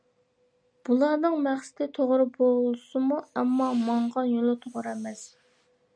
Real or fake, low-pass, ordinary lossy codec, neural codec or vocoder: real; 9.9 kHz; MP3, 96 kbps; none